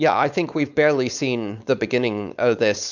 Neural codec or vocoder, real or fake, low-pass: codec, 16 kHz, 4.8 kbps, FACodec; fake; 7.2 kHz